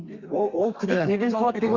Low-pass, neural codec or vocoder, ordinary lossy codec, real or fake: 7.2 kHz; codec, 32 kHz, 1.9 kbps, SNAC; Opus, 64 kbps; fake